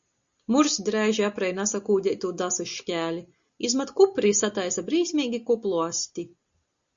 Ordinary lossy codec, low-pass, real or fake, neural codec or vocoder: Opus, 64 kbps; 7.2 kHz; real; none